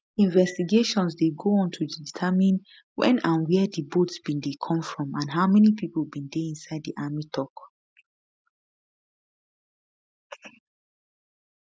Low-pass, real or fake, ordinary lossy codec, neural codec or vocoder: none; real; none; none